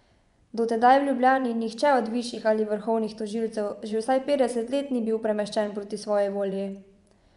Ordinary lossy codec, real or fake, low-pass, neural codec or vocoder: none; fake; 10.8 kHz; vocoder, 24 kHz, 100 mel bands, Vocos